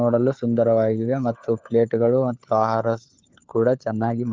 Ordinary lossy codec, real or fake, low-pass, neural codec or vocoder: Opus, 32 kbps; fake; 7.2 kHz; codec, 16 kHz, 4 kbps, FunCodec, trained on LibriTTS, 50 frames a second